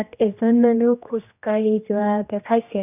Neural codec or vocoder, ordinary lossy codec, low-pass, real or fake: codec, 16 kHz, 1 kbps, X-Codec, HuBERT features, trained on general audio; none; 3.6 kHz; fake